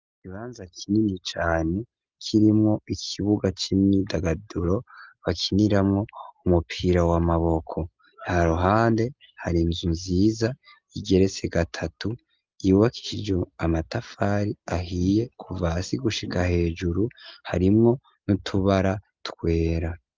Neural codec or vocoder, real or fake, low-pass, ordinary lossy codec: none; real; 7.2 kHz; Opus, 32 kbps